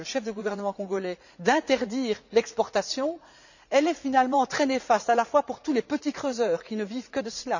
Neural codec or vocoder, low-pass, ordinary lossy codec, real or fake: vocoder, 44.1 kHz, 80 mel bands, Vocos; 7.2 kHz; none; fake